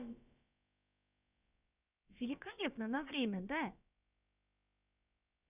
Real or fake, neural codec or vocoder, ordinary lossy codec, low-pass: fake; codec, 16 kHz, about 1 kbps, DyCAST, with the encoder's durations; none; 3.6 kHz